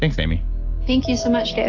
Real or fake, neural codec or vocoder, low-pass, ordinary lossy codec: real; none; 7.2 kHz; AAC, 32 kbps